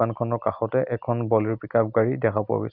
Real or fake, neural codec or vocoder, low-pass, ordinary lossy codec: real; none; 5.4 kHz; Opus, 64 kbps